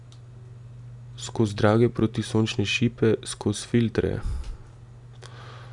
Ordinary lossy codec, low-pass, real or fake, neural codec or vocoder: none; 10.8 kHz; real; none